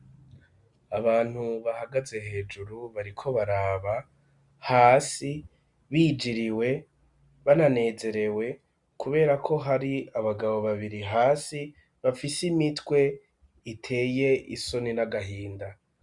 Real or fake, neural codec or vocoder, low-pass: real; none; 10.8 kHz